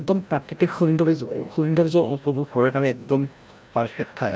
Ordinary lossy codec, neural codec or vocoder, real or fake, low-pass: none; codec, 16 kHz, 0.5 kbps, FreqCodec, larger model; fake; none